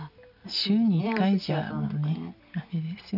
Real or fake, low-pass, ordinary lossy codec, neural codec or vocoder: real; 5.4 kHz; none; none